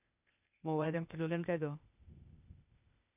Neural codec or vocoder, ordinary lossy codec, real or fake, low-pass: codec, 16 kHz, 0.8 kbps, ZipCodec; none; fake; 3.6 kHz